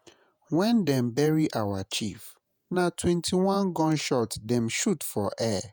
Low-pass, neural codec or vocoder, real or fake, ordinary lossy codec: none; vocoder, 48 kHz, 128 mel bands, Vocos; fake; none